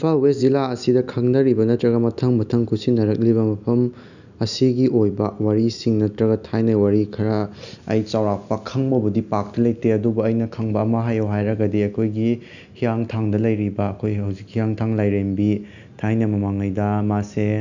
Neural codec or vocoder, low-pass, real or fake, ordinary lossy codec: none; 7.2 kHz; real; none